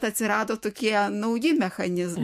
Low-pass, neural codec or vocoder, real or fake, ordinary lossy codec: 14.4 kHz; autoencoder, 48 kHz, 128 numbers a frame, DAC-VAE, trained on Japanese speech; fake; MP3, 64 kbps